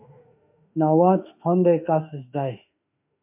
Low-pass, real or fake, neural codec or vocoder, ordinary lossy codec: 3.6 kHz; fake; autoencoder, 48 kHz, 32 numbers a frame, DAC-VAE, trained on Japanese speech; MP3, 32 kbps